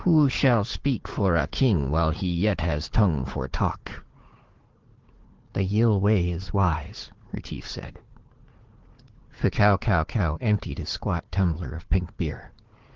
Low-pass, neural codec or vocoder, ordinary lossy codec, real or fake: 7.2 kHz; codec, 16 kHz, 4 kbps, FunCodec, trained on Chinese and English, 50 frames a second; Opus, 16 kbps; fake